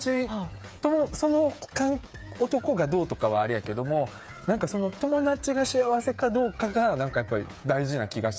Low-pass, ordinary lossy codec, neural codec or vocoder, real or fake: none; none; codec, 16 kHz, 8 kbps, FreqCodec, smaller model; fake